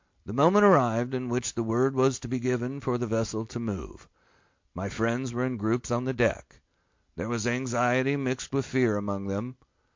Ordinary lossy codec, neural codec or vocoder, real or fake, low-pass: MP3, 48 kbps; none; real; 7.2 kHz